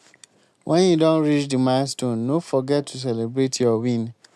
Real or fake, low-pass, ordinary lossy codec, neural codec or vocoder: real; none; none; none